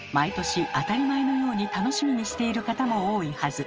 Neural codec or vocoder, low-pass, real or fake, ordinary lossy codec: none; 7.2 kHz; real; Opus, 24 kbps